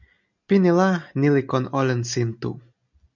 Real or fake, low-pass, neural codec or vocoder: real; 7.2 kHz; none